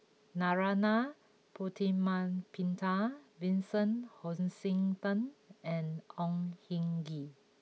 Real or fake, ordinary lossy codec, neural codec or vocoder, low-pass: real; none; none; none